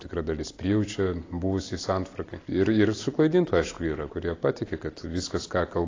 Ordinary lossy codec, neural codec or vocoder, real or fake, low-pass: AAC, 32 kbps; none; real; 7.2 kHz